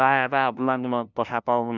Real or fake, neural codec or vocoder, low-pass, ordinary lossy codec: fake; codec, 16 kHz, 0.5 kbps, FunCodec, trained on LibriTTS, 25 frames a second; 7.2 kHz; none